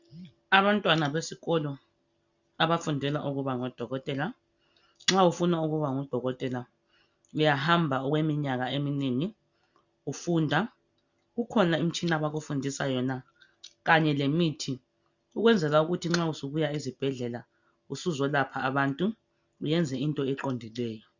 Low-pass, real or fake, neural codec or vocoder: 7.2 kHz; real; none